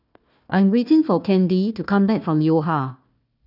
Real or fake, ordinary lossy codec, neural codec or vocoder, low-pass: fake; none; codec, 16 kHz, 1 kbps, FunCodec, trained on Chinese and English, 50 frames a second; 5.4 kHz